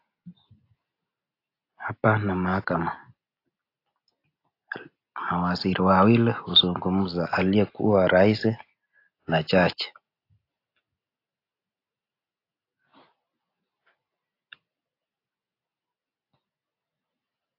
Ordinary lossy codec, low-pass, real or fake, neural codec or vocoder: AAC, 32 kbps; 5.4 kHz; real; none